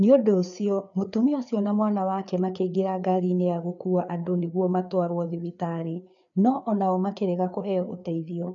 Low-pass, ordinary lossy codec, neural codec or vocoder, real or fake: 7.2 kHz; none; codec, 16 kHz, 4 kbps, FreqCodec, larger model; fake